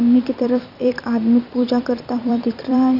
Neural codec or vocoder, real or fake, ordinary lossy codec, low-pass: vocoder, 44.1 kHz, 128 mel bands every 256 samples, BigVGAN v2; fake; none; 5.4 kHz